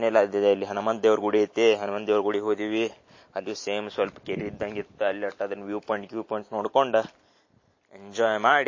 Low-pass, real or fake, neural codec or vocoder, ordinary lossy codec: 7.2 kHz; real; none; MP3, 32 kbps